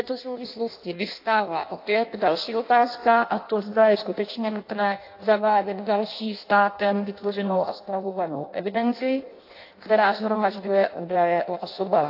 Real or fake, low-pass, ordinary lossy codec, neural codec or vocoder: fake; 5.4 kHz; AAC, 32 kbps; codec, 16 kHz in and 24 kHz out, 0.6 kbps, FireRedTTS-2 codec